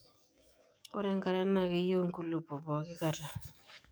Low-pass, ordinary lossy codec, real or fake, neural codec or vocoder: none; none; fake; codec, 44.1 kHz, 7.8 kbps, DAC